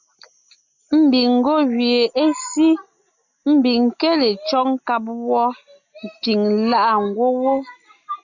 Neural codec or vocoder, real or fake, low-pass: none; real; 7.2 kHz